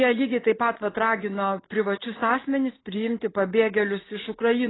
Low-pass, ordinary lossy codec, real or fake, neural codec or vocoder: 7.2 kHz; AAC, 16 kbps; real; none